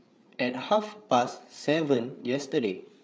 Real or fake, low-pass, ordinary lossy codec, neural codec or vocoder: fake; none; none; codec, 16 kHz, 8 kbps, FreqCodec, larger model